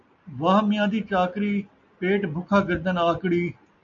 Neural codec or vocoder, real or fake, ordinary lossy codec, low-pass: none; real; AAC, 64 kbps; 7.2 kHz